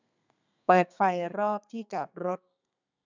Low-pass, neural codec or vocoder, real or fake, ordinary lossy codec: 7.2 kHz; codec, 32 kHz, 1.9 kbps, SNAC; fake; none